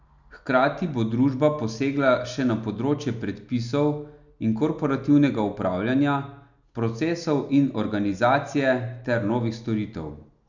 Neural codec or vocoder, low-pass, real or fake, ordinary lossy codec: none; 7.2 kHz; real; none